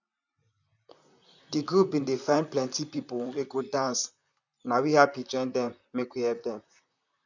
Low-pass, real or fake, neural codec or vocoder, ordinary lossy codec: 7.2 kHz; fake; vocoder, 44.1 kHz, 128 mel bands, Pupu-Vocoder; none